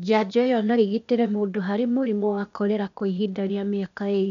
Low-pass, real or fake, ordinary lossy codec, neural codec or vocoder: 7.2 kHz; fake; none; codec, 16 kHz, 0.8 kbps, ZipCodec